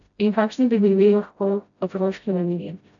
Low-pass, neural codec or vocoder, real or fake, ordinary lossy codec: 7.2 kHz; codec, 16 kHz, 0.5 kbps, FreqCodec, smaller model; fake; AAC, 64 kbps